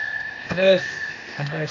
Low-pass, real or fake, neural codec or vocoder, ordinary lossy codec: 7.2 kHz; fake; codec, 16 kHz, 0.8 kbps, ZipCodec; AAC, 48 kbps